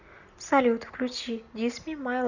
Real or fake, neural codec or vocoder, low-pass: real; none; 7.2 kHz